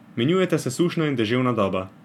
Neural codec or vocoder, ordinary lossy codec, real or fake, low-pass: none; none; real; 19.8 kHz